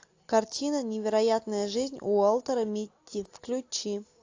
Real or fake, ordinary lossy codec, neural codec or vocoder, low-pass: real; AAC, 48 kbps; none; 7.2 kHz